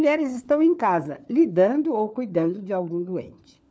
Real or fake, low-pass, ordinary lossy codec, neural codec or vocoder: fake; none; none; codec, 16 kHz, 4 kbps, FunCodec, trained on LibriTTS, 50 frames a second